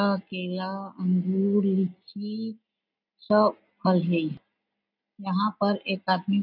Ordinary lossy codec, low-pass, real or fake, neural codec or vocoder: none; 5.4 kHz; real; none